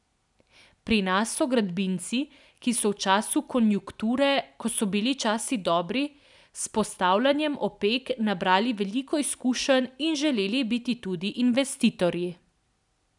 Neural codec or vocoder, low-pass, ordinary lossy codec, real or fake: none; 10.8 kHz; none; real